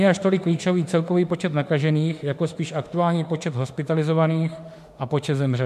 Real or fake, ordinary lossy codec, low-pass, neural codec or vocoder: fake; MP3, 96 kbps; 14.4 kHz; autoencoder, 48 kHz, 32 numbers a frame, DAC-VAE, trained on Japanese speech